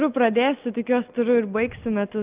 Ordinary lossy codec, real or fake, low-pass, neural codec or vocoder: Opus, 32 kbps; real; 3.6 kHz; none